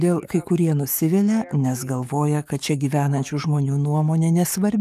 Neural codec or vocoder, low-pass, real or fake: codec, 44.1 kHz, 7.8 kbps, DAC; 14.4 kHz; fake